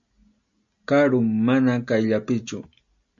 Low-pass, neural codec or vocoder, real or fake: 7.2 kHz; none; real